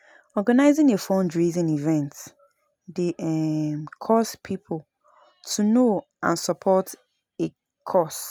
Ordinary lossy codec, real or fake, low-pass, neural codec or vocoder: none; real; none; none